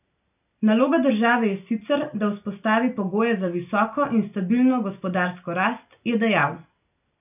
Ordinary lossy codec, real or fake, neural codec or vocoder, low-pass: none; real; none; 3.6 kHz